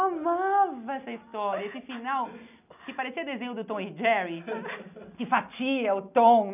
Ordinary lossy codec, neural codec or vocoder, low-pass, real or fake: none; none; 3.6 kHz; real